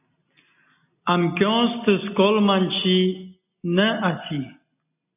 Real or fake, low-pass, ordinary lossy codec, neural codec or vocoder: real; 3.6 kHz; AAC, 32 kbps; none